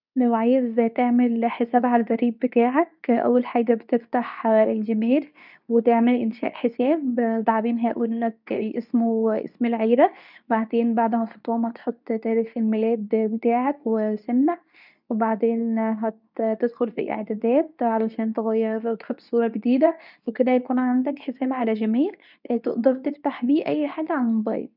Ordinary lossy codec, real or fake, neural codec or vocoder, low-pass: none; fake; codec, 24 kHz, 0.9 kbps, WavTokenizer, medium speech release version 2; 5.4 kHz